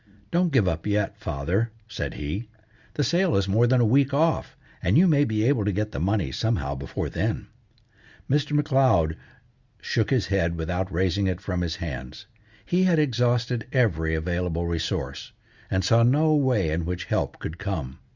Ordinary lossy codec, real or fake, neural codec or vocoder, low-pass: Opus, 64 kbps; real; none; 7.2 kHz